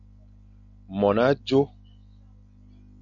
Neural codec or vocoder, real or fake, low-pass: none; real; 7.2 kHz